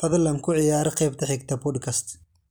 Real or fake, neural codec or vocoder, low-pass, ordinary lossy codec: real; none; none; none